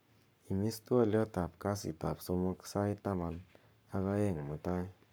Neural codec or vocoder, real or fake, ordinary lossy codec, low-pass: codec, 44.1 kHz, 7.8 kbps, Pupu-Codec; fake; none; none